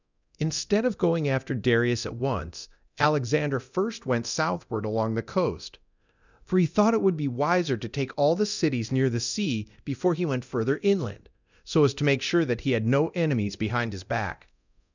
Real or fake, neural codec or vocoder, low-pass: fake; codec, 24 kHz, 0.9 kbps, DualCodec; 7.2 kHz